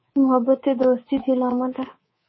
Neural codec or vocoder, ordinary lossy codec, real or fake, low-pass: codec, 24 kHz, 3.1 kbps, DualCodec; MP3, 24 kbps; fake; 7.2 kHz